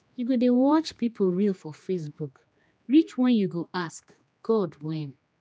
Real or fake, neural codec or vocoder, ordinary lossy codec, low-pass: fake; codec, 16 kHz, 2 kbps, X-Codec, HuBERT features, trained on general audio; none; none